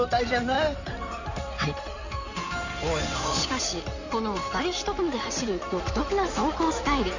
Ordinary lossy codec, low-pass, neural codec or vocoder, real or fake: none; 7.2 kHz; codec, 16 kHz in and 24 kHz out, 2.2 kbps, FireRedTTS-2 codec; fake